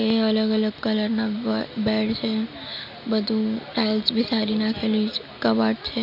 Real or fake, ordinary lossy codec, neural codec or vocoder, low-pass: real; none; none; 5.4 kHz